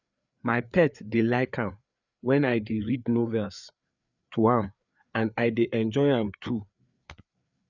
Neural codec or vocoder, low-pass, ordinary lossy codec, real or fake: codec, 16 kHz, 4 kbps, FreqCodec, larger model; 7.2 kHz; none; fake